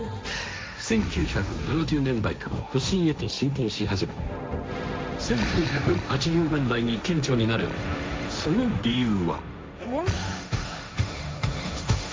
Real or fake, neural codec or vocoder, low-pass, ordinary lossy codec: fake; codec, 16 kHz, 1.1 kbps, Voila-Tokenizer; none; none